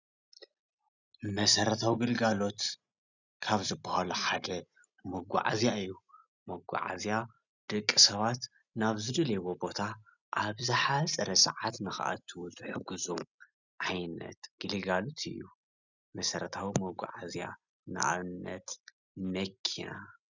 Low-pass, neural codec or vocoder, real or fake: 7.2 kHz; none; real